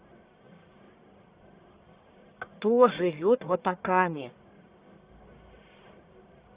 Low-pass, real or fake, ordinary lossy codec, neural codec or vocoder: 3.6 kHz; fake; Opus, 64 kbps; codec, 44.1 kHz, 1.7 kbps, Pupu-Codec